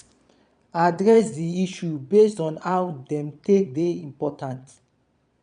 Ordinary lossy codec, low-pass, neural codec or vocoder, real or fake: none; 9.9 kHz; vocoder, 22.05 kHz, 80 mel bands, Vocos; fake